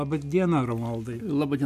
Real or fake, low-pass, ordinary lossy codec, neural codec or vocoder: real; 14.4 kHz; MP3, 96 kbps; none